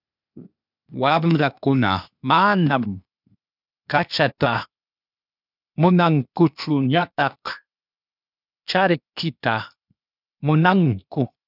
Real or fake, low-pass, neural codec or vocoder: fake; 5.4 kHz; codec, 16 kHz, 0.8 kbps, ZipCodec